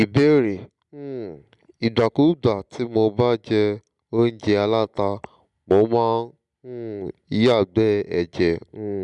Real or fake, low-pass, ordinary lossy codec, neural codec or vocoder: real; 10.8 kHz; none; none